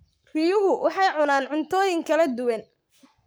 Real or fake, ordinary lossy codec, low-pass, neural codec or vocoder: fake; none; none; codec, 44.1 kHz, 7.8 kbps, Pupu-Codec